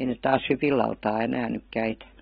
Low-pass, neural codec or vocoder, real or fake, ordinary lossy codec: 19.8 kHz; none; real; AAC, 16 kbps